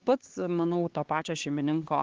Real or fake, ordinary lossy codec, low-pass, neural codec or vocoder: fake; Opus, 16 kbps; 7.2 kHz; codec, 16 kHz, 2 kbps, X-Codec, HuBERT features, trained on LibriSpeech